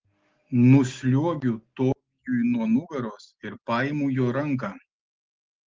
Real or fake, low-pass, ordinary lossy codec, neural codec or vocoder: real; 7.2 kHz; Opus, 16 kbps; none